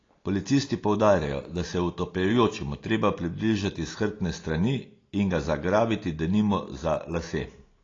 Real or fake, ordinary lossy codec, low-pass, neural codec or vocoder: real; AAC, 32 kbps; 7.2 kHz; none